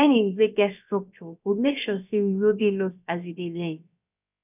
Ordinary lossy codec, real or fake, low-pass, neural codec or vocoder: none; fake; 3.6 kHz; codec, 16 kHz, about 1 kbps, DyCAST, with the encoder's durations